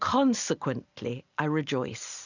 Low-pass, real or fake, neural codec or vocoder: 7.2 kHz; real; none